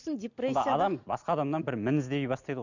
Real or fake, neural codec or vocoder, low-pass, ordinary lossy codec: real; none; 7.2 kHz; none